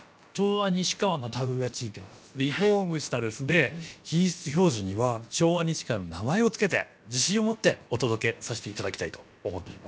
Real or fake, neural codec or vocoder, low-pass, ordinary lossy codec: fake; codec, 16 kHz, about 1 kbps, DyCAST, with the encoder's durations; none; none